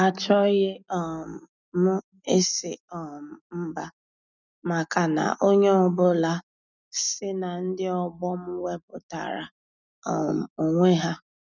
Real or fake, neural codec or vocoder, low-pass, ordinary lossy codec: real; none; 7.2 kHz; none